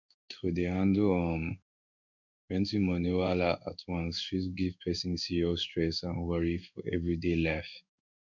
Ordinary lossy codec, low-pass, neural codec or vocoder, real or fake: none; 7.2 kHz; codec, 16 kHz in and 24 kHz out, 1 kbps, XY-Tokenizer; fake